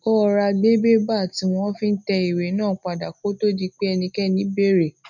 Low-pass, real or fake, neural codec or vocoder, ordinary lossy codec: 7.2 kHz; real; none; none